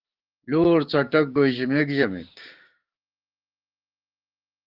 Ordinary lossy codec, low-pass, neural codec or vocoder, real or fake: Opus, 16 kbps; 5.4 kHz; codec, 16 kHz, 6 kbps, DAC; fake